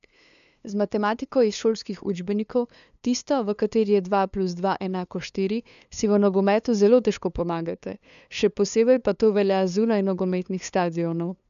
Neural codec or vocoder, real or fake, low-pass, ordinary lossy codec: codec, 16 kHz, 2 kbps, FunCodec, trained on LibriTTS, 25 frames a second; fake; 7.2 kHz; none